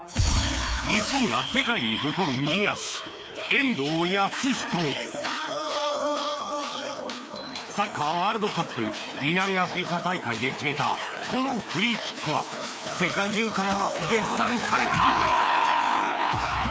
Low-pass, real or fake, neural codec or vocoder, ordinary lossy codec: none; fake; codec, 16 kHz, 2 kbps, FreqCodec, larger model; none